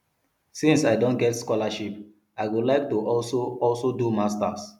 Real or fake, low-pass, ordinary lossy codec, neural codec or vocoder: real; 19.8 kHz; none; none